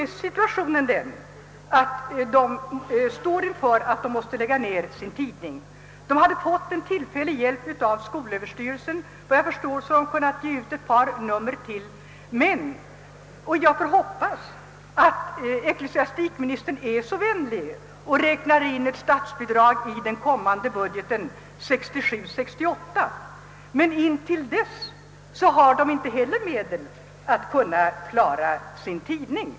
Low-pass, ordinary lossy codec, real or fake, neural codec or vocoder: none; none; real; none